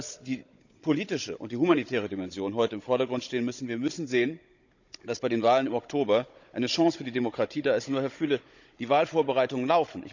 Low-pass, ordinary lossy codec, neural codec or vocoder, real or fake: 7.2 kHz; none; codec, 16 kHz, 16 kbps, FunCodec, trained on Chinese and English, 50 frames a second; fake